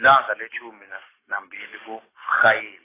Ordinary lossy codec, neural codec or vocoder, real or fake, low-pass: AAC, 16 kbps; codec, 44.1 kHz, 7.8 kbps, DAC; fake; 3.6 kHz